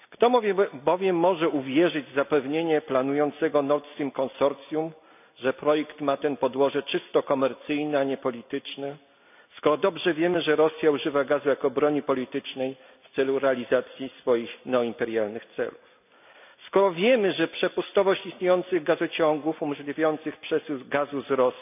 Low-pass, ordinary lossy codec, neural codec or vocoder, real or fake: 3.6 kHz; none; none; real